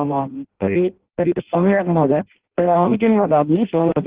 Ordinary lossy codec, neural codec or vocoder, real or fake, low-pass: Opus, 16 kbps; codec, 16 kHz in and 24 kHz out, 0.6 kbps, FireRedTTS-2 codec; fake; 3.6 kHz